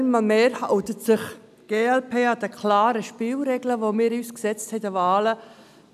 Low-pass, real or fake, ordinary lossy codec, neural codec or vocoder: 14.4 kHz; real; none; none